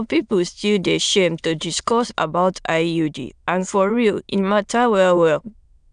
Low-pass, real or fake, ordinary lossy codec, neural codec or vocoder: 9.9 kHz; fake; none; autoencoder, 22.05 kHz, a latent of 192 numbers a frame, VITS, trained on many speakers